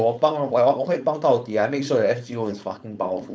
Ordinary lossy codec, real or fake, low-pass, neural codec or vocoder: none; fake; none; codec, 16 kHz, 4.8 kbps, FACodec